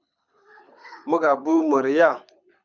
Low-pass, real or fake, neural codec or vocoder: 7.2 kHz; fake; codec, 24 kHz, 6 kbps, HILCodec